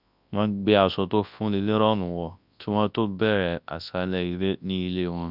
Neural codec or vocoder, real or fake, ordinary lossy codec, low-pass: codec, 24 kHz, 0.9 kbps, WavTokenizer, large speech release; fake; none; 5.4 kHz